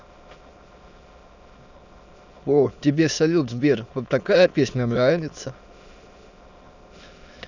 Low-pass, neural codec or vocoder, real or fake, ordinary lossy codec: 7.2 kHz; autoencoder, 22.05 kHz, a latent of 192 numbers a frame, VITS, trained on many speakers; fake; none